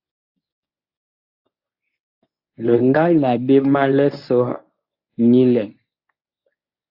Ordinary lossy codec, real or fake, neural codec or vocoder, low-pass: AAC, 48 kbps; fake; codec, 24 kHz, 0.9 kbps, WavTokenizer, medium speech release version 1; 5.4 kHz